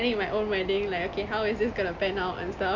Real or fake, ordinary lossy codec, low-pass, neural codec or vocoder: real; none; 7.2 kHz; none